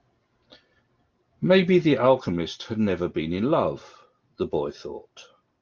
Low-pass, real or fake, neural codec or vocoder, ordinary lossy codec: 7.2 kHz; real; none; Opus, 32 kbps